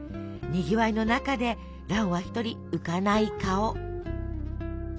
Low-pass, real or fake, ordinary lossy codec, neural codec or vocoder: none; real; none; none